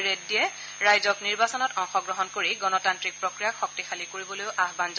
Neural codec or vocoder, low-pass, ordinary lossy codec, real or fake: none; none; none; real